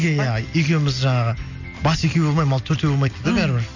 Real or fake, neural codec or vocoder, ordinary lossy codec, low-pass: real; none; none; 7.2 kHz